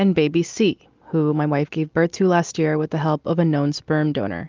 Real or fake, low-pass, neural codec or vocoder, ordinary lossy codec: real; 7.2 kHz; none; Opus, 32 kbps